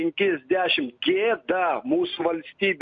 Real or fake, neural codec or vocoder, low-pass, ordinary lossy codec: real; none; 7.2 kHz; MP3, 48 kbps